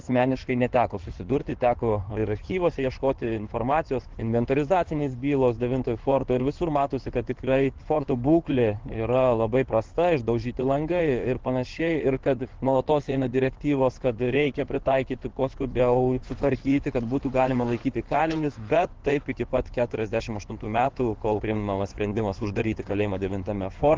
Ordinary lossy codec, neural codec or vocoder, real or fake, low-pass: Opus, 16 kbps; codec, 16 kHz in and 24 kHz out, 2.2 kbps, FireRedTTS-2 codec; fake; 7.2 kHz